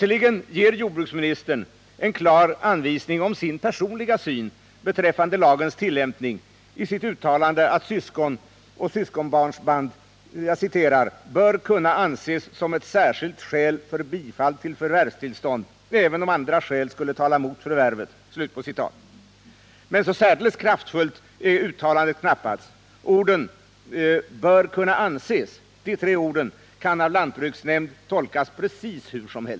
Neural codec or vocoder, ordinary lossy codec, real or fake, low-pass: none; none; real; none